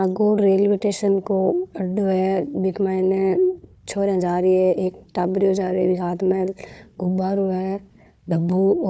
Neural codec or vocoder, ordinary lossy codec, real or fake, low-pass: codec, 16 kHz, 4 kbps, FunCodec, trained on Chinese and English, 50 frames a second; none; fake; none